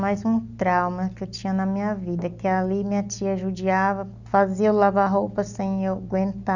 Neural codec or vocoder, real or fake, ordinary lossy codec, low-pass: none; real; none; 7.2 kHz